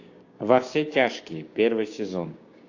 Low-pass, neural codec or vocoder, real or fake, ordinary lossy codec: 7.2 kHz; codec, 16 kHz, 6 kbps, DAC; fake; AAC, 48 kbps